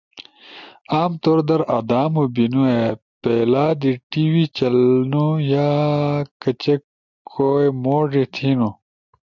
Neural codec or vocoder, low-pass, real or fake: none; 7.2 kHz; real